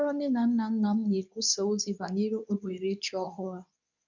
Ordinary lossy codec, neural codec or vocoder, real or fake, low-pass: none; codec, 24 kHz, 0.9 kbps, WavTokenizer, medium speech release version 2; fake; 7.2 kHz